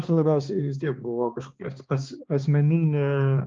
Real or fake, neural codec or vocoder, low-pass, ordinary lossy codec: fake; codec, 16 kHz, 2 kbps, X-Codec, HuBERT features, trained on balanced general audio; 7.2 kHz; Opus, 24 kbps